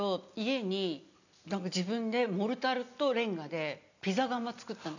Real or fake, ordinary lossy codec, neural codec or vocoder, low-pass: real; none; none; 7.2 kHz